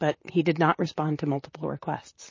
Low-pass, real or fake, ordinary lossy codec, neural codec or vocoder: 7.2 kHz; fake; MP3, 32 kbps; vocoder, 44.1 kHz, 128 mel bands, Pupu-Vocoder